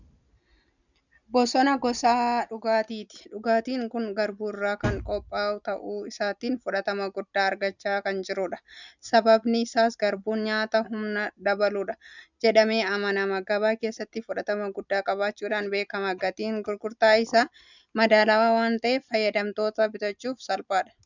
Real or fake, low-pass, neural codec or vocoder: real; 7.2 kHz; none